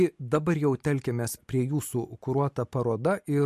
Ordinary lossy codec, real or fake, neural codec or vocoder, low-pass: MP3, 64 kbps; real; none; 14.4 kHz